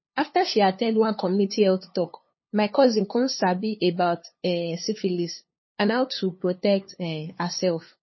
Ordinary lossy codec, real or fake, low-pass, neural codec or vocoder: MP3, 24 kbps; fake; 7.2 kHz; codec, 16 kHz, 2 kbps, FunCodec, trained on LibriTTS, 25 frames a second